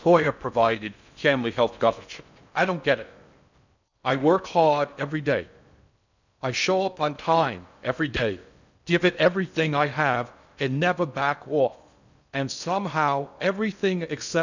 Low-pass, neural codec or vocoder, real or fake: 7.2 kHz; codec, 16 kHz in and 24 kHz out, 0.6 kbps, FocalCodec, streaming, 4096 codes; fake